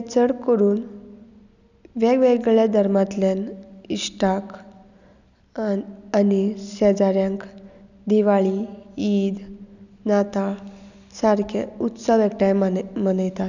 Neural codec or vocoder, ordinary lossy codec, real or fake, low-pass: none; none; real; 7.2 kHz